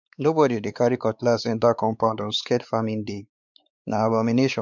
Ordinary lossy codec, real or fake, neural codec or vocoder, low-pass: none; fake; codec, 16 kHz, 4 kbps, X-Codec, WavLM features, trained on Multilingual LibriSpeech; 7.2 kHz